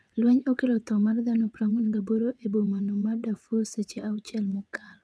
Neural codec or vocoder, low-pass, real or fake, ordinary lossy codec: vocoder, 22.05 kHz, 80 mel bands, WaveNeXt; none; fake; none